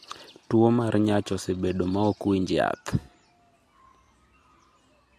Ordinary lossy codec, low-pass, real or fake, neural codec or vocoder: MP3, 64 kbps; 14.4 kHz; real; none